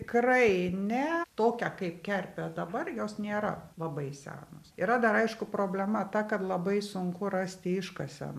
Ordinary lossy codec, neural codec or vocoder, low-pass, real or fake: AAC, 96 kbps; none; 14.4 kHz; real